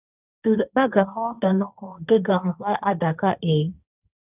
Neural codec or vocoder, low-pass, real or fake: codec, 16 kHz, 1.1 kbps, Voila-Tokenizer; 3.6 kHz; fake